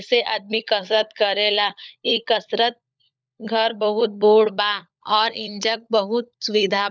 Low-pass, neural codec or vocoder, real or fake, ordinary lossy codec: none; codec, 16 kHz, 16 kbps, FunCodec, trained on LibriTTS, 50 frames a second; fake; none